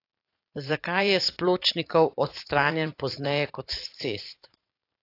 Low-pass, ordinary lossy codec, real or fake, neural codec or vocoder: 5.4 kHz; AAC, 32 kbps; fake; vocoder, 44.1 kHz, 80 mel bands, Vocos